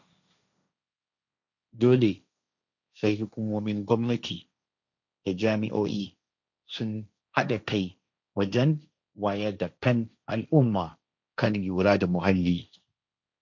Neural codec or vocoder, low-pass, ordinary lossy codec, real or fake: codec, 16 kHz, 1.1 kbps, Voila-Tokenizer; 7.2 kHz; none; fake